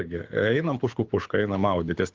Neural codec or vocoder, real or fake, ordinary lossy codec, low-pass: codec, 24 kHz, 6 kbps, HILCodec; fake; Opus, 32 kbps; 7.2 kHz